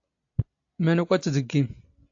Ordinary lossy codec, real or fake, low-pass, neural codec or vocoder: AAC, 48 kbps; real; 7.2 kHz; none